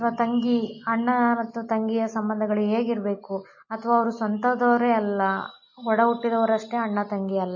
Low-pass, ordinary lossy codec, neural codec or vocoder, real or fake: 7.2 kHz; MP3, 32 kbps; none; real